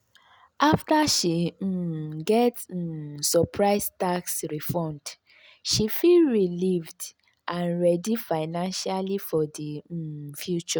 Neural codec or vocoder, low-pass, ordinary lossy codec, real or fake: none; none; none; real